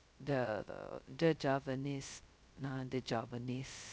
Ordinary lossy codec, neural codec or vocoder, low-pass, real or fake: none; codec, 16 kHz, 0.2 kbps, FocalCodec; none; fake